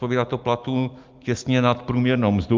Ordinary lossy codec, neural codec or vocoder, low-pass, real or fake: Opus, 32 kbps; none; 7.2 kHz; real